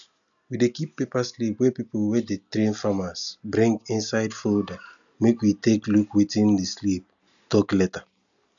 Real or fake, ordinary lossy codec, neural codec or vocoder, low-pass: real; none; none; 7.2 kHz